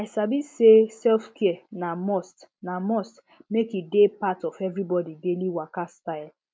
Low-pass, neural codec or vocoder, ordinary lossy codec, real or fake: none; none; none; real